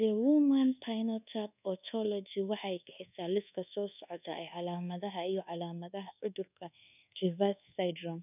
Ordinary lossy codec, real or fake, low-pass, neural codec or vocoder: none; fake; 3.6 kHz; codec, 24 kHz, 1.2 kbps, DualCodec